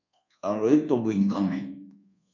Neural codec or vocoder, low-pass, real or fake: codec, 24 kHz, 1.2 kbps, DualCodec; 7.2 kHz; fake